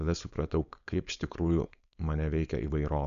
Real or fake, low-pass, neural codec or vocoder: fake; 7.2 kHz; codec, 16 kHz, 4.8 kbps, FACodec